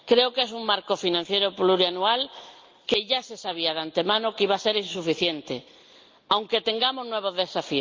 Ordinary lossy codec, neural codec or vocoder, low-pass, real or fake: Opus, 32 kbps; none; 7.2 kHz; real